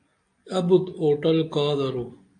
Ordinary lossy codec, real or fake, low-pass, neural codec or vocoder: AAC, 48 kbps; real; 9.9 kHz; none